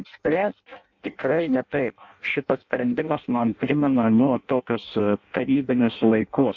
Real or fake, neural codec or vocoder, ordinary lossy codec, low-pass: fake; codec, 16 kHz in and 24 kHz out, 0.6 kbps, FireRedTTS-2 codec; AAC, 48 kbps; 7.2 kHz